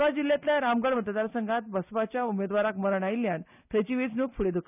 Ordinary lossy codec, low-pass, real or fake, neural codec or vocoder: none; 3.6 kHz; real; none